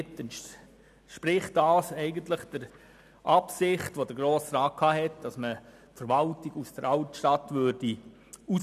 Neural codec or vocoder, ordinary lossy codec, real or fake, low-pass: none; none; real; 14.4 kHz